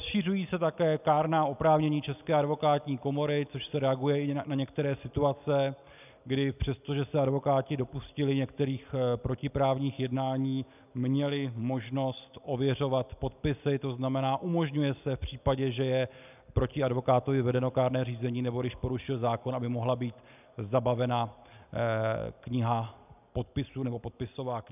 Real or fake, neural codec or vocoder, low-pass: real; none; 3.6 kHz